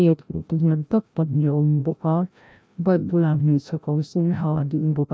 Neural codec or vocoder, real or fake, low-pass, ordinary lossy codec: codec, 16 kHz, 0.5 kbps, FreqCodec, larger model; fake; none; none